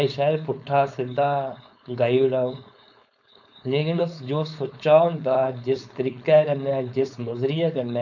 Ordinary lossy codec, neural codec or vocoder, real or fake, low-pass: none; codec, 16 kHz, 4.8 kbps, FACodec; fake; 7.2 kHz